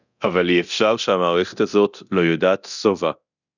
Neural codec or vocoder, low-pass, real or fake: codec, 24 kHz, 0.9 kbps, DualCodec; 7.2 kHz; fake